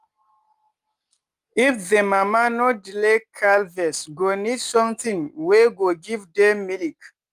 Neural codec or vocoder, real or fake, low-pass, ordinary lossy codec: none; real; 14.4 kHz; Opus, 32 kbps